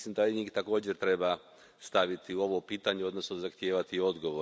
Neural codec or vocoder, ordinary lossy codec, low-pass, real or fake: none; none; none; real